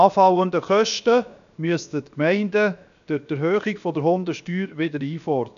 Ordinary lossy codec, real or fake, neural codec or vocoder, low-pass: none; fake; codec, 16 kHz, about 1 kbps, DyCAST, with the encoder's durations; 7.2 kHz